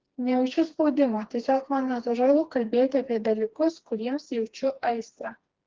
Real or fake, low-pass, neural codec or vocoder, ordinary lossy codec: fake; 7.2 kHz; codec, 16 kHz, 2 kbps, FreqCodec, smaller model; Opus, 16 kbps